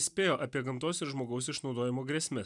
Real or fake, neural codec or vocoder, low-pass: real; none; 10.8 kHz